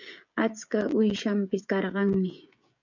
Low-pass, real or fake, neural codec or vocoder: 7.2 kHz; fake; codec, 16 kHz, 16 kbps, FreqCodec, smaller model